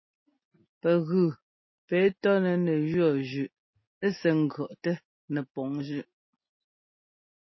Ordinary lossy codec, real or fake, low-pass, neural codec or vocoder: MP3, 24 kbps; real; 7.2 kHz; none